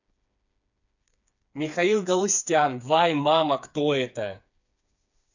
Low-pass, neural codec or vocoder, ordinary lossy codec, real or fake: 7.2 kHz; codec, 16 kHz, 4 kbps, FreqCodec, smaller model; none; fake